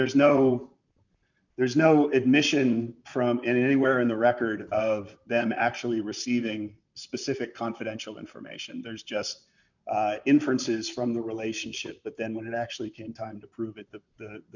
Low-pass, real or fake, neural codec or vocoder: 7.2 kHz; fake; vocoder, 44.1 kHz, 128 mel bands, Pupu-Vocoder